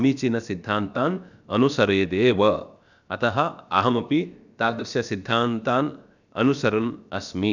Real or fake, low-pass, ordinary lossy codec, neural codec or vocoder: fake; 7.2 kHz; none; codec, 16 kHz, about 1 kbps, DyCAST, with the encoder's durations